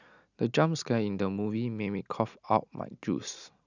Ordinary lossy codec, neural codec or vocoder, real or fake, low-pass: none; none; real; 7.2 kHz